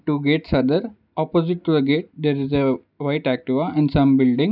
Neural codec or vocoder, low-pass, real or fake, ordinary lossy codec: none; 5.4 kHz; real; none